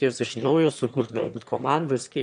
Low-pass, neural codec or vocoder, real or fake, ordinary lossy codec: 9.9 kHz; autoencoder, 22.05 kHz, a latent of 192 numbers a frame, VITS, trained on one speaker; fake; MP3, 64 kbps